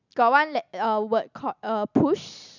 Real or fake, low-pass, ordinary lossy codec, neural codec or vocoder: real; 7.2 kHz; none; none